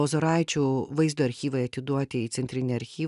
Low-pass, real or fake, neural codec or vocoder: 10.8 kHz; real; none